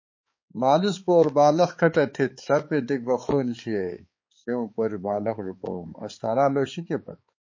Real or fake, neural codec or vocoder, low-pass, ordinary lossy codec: fake; codec, 16 kHz, 4 kbps, X-Codec, HuBERT features, trained on balanced general audio; 7.2 kHz; MP3, 32 kbps